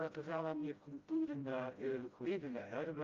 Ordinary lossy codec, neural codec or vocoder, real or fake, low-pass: Opus, 24 kbps; codec, 16 kHz, 0.5 kbps, FreqCodec, smaller model; fake; 7.2 kHz